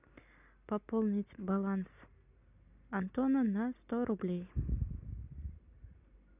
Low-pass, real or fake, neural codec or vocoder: 3.6 kHz; real; none